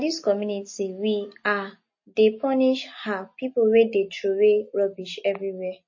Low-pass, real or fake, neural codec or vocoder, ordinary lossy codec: 7.2 kHz; real; none; MP3, 32 kbps